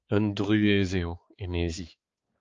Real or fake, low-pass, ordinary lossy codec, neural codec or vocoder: fake; 7.2 kHz; Opus, 24 kbps; codec, 16 kHz, 4 kbps, X-Codec, HuBERT features, trained on balanced general audio